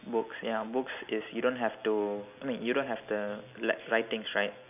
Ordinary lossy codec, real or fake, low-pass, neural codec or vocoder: none; real; 3.6 kHz; none